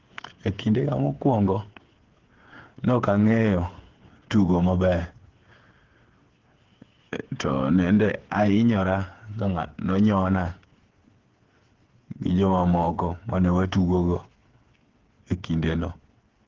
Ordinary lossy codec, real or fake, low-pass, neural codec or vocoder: Opus, 16 kbps; fake; 7.2 kHz; codec, 16 kHz, 8 kbps, FreqCodec, smaller model